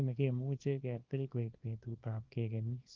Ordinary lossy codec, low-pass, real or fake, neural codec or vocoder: Opus, 32 kbps; 7.2 kHz; fake; codec, 24 kHz, 0.9 kbps, WavTokenizer, small release